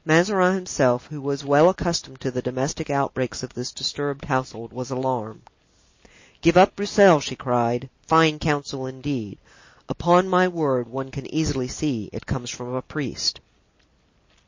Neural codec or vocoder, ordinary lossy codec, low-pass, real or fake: none; MP3, 32 kbps; 7.2 kHz; real